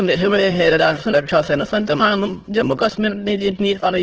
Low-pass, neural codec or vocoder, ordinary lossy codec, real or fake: 7.2 kHz; autoencoder, 22.05 kHz, a latent of 192 numbers a frame, VITS, trained on many speakers; Opus, 16 kbps; fake